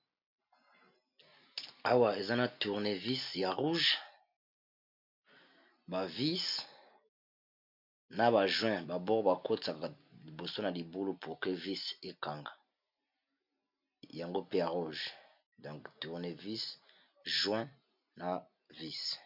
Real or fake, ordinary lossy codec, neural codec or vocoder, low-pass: real; MP3, 48 kbps; none; 5.4 kHz